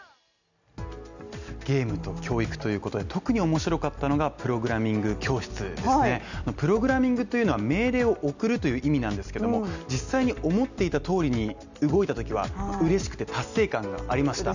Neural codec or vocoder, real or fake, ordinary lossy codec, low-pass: none; real; none; 7.2 kHz